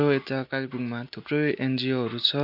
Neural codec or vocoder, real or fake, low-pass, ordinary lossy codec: none; real; 5.4 kHz; none